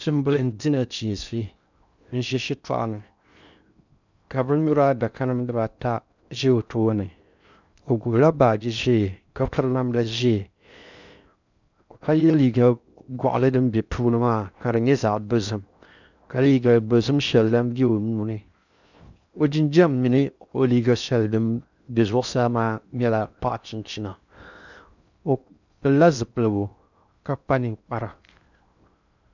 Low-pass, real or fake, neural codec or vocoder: 7.2 kHz; fake; codec, 16 kHz in and 24 kHz out, 0.6 kbps, FocalCodec, streaming, 4096 codes